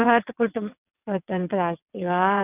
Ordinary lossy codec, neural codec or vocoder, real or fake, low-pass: none; vocoder, 22.05 kHz, 80 mel bands, WaveNeXt; fake; 3.6 kHz